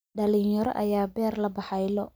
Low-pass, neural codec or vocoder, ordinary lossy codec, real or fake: none; none; none; real